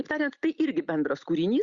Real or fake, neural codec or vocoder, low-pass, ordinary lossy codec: real; none; 7.2 kHz; Opus, 64 kbps